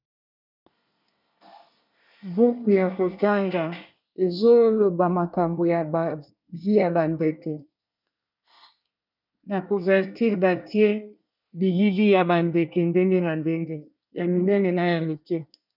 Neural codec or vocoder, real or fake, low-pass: codec, 24 kHz, 1 kbps, SNAC; fake; 5.4 kHz